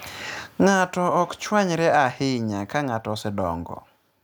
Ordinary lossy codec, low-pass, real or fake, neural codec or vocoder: none; none; real; none